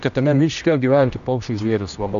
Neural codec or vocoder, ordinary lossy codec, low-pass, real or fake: codec, 16 kHz, 1 kbps, X-Codec, HuBERT features, trained on general audio; AAC, 96 kbps; 7.2 kHz; fake